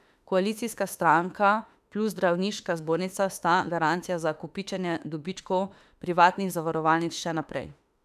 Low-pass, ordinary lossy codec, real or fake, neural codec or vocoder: 14.4 kHz; none; fake; autoencoder, 48 kHz, 32 numbers a frame, DAC-VAE, trained on Japanese speech